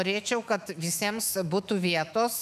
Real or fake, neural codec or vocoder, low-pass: fake; autoencoder, 48 kHz, 128 numbers a frame, DAC-VAE, trained on Japanese speech; 14.4 kHz